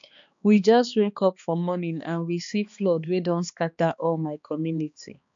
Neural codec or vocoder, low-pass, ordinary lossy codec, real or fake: codec, 16 kHz, 2 kbps, X-Codec, HuBERT features, trained on balanced general audio; 7.2 kHz; MP3, 64 kbps; fake